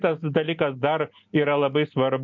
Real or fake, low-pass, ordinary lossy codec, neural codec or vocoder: real; 7.2 kHz; MP3, 48 kbps; none